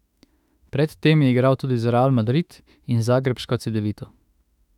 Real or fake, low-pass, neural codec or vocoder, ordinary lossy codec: fake; 19.8 kHz; autoencoder, 48 kHz, 32 numbers a frame, DAC-VAE, trained on Japanese speech; none